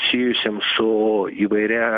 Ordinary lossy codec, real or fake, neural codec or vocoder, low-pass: MP3, 96 kbps; real; none; 7.2 kHz